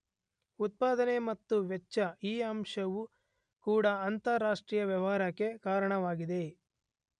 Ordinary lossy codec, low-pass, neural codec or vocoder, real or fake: none; 9.9 kHz; none; real